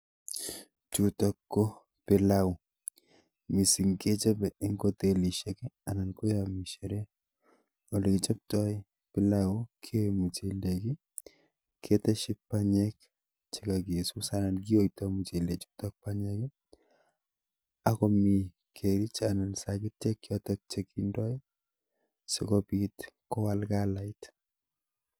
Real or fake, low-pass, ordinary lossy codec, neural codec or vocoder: real; none; none; none